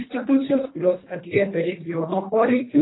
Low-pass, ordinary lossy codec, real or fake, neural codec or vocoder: 7.2 kHz; AAC, 16 kbps; fake; codec, 24 kHz, 1.5 kbps, HILCodec